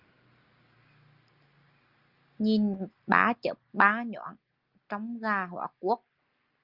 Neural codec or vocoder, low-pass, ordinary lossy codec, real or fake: none; 5.4 kHz; Opus, 32 kbps; real